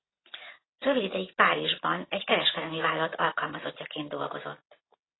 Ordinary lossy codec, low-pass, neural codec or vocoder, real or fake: AAC, 16 kbps; 7.2 kHz; none; real